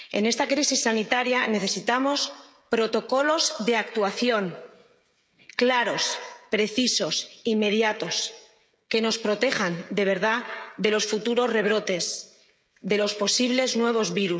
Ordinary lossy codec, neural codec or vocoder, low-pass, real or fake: none; codec, 16 kHz, 16 kbps, FreqCodec, smaller model; none; fake